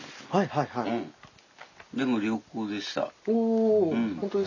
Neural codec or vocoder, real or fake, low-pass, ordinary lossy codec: none; real; 7.2 kHz; none